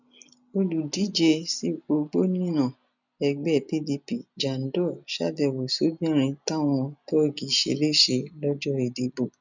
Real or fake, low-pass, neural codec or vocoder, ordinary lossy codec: real; 7.2 kHz; none; none